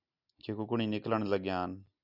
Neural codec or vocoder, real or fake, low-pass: none; real; 5.4 kHz